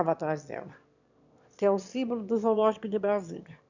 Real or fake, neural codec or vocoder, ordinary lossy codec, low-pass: fake; autoencoder, 22.05 kHz, a latent of 192 numbers a frame, VITS, trained on one speaker; none; 7.2 kHz